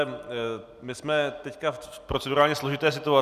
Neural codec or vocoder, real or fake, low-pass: none; real; 14.4 kHz